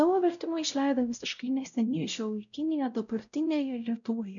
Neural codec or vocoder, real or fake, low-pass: codec, 16 kHz, 0.5 kbps, X-Codec, WavLM features, trained on Multilingual LibriSpeech; fake; 7.2 kHz